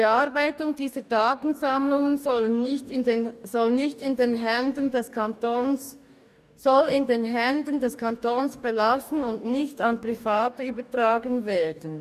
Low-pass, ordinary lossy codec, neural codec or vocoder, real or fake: 14.4 kHz; none; codec, 44.1 kHz, 2.6 kbps, DAC; fake